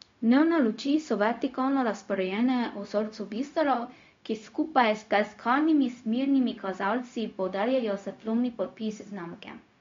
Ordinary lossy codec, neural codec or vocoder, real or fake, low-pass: MP3, 48 kbps; codec, 16 kHz, 0.4 kbps, LongCat-Audio-Codec; fake; 7.2 kHz